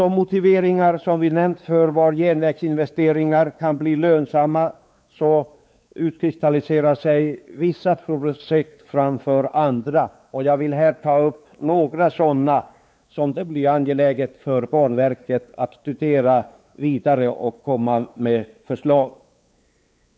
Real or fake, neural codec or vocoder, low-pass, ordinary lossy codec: fake; codec, 16 kHz, 4 kbps, X-Codec, WavLM features, trained on Multilingual LibriSpeech; none; none